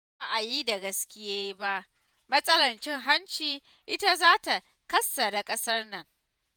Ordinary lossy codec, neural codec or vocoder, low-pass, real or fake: none; vocoder, 48 kHz, 128 mel bands, Vocos; none; fake